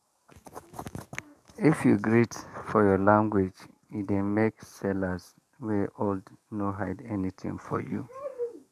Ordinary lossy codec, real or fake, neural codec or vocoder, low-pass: none; fake; codec, 44.1 kHz, 7.8 kbps, DAC; 14.4 kHz